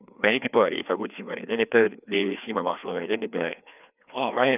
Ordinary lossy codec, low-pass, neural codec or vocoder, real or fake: none; 3.6 kHz; codec, 16 kHz, 2 kbps, FreqCodec, larger model; fake